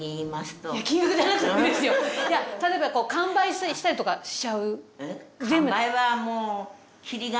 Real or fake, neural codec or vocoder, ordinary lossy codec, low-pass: real; none; none; none